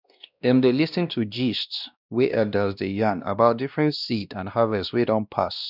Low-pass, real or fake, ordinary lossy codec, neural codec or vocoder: 5.4 kHz; fake; none; codec, 16 kHz, 1 kbps, X-Codec, WavLM features, trained on Multilingual LibriSpeech